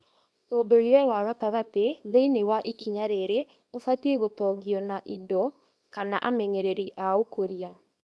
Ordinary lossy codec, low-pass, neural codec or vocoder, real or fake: none; none; codec, 24 kHz, 0.9 kbps, WavTokenizer, small release; fake